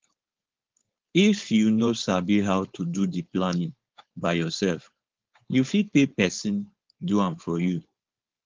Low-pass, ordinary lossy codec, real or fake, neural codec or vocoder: 7.2 kHz; Opus, 32 kbps; fake; codec, 16 kHz, 4.8 kbps, FACodec